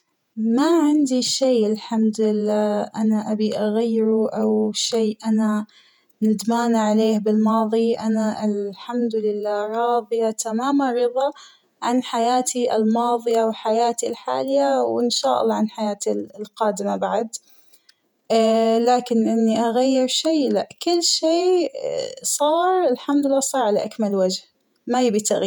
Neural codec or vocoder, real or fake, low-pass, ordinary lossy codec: vocoder, 48 kHz, 128 mel bands, Vocos; fake; 19.8 kHz; none